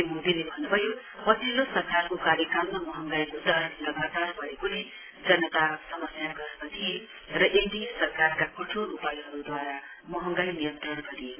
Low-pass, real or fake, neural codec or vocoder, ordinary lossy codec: 3.6 kHz; real; none; AAC, 16 kbps